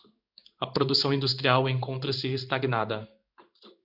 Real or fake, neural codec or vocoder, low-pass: fake; codec, 16 kHz in and 24 kHz out, 1 kbps, XY-Tokenizer; 5.4 kHz